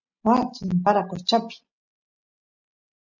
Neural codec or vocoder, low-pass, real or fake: none; 7.2 kHz; real